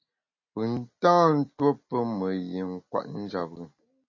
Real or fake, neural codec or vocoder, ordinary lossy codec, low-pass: real; none; MP3, 32 kbps; 7.2 kHz